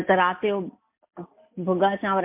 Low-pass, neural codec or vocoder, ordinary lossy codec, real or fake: 3.6 kHz; none; MP3, 32 kbps; real